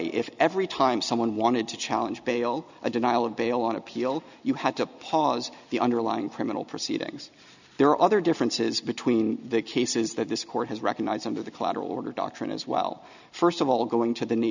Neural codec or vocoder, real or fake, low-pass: none; real; 7.2 kHz